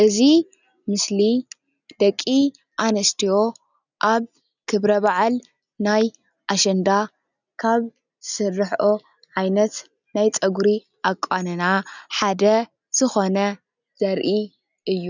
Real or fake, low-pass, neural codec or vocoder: real; 7.2 kHz; none